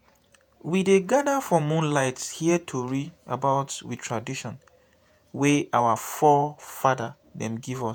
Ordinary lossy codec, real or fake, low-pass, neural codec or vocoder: none; fake; none; vocoder, 48 kHz, 128 mel bands, Vocos